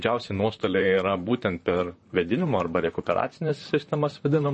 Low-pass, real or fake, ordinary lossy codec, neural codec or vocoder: 10.8 kHz; fake; MP3, 32 kbps; vocoder, 44.1 kHz, 128 mel bands, Pupu-Vocoder